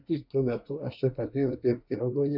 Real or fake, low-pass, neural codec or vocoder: fake; 5.4 kHz; codec, 24 kHz, 1 kbps, SNAC